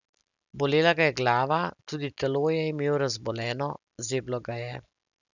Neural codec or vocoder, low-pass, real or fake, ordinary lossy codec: none; 7.2 kHz; real; none